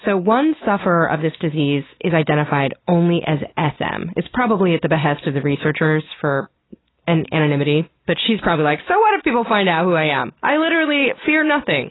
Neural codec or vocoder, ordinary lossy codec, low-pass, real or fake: none; AAC, 16 kbps; 7.2 kHz; real